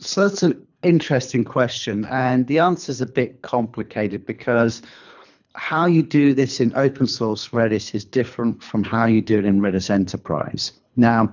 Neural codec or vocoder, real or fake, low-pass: codec, 24 kHz, 3 kbps, HILCodec; fake; 7.2 kHz